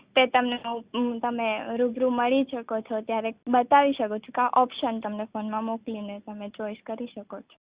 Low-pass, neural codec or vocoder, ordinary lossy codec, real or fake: 3.6 kHz; none; Opus, 64 kbps; real